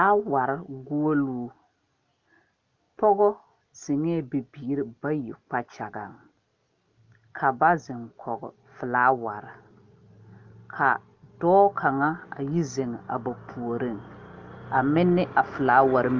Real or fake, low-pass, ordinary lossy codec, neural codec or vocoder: real; 7.2 kHz; Opus, 24 kbps; none